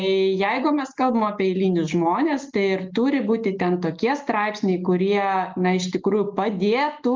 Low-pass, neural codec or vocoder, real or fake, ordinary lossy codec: 7.2 kHz; none; real; Opus, 32 kbps